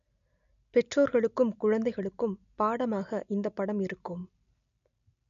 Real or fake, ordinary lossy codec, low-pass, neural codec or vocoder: real; none; 7.2 kHz; none